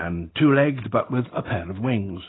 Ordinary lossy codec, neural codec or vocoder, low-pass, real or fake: AAC, 16 kbps; none; 7.2 kHz; real